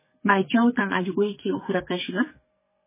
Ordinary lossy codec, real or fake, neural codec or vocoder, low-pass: MP3, 16 kbps; fake; codec, 44.1 kHz, 2.6 kbps, SNAC; 3.6 kHz